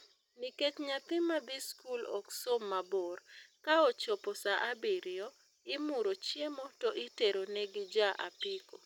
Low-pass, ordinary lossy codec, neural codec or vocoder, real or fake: 19.8 kHz; none; none; real